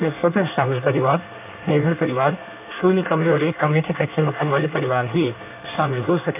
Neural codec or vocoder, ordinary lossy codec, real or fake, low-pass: codec, 24 kHz, 1 kbps, SNAC; none; fake; 3.6 kHz